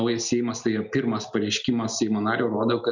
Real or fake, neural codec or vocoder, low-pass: real; none; 7.2 kHz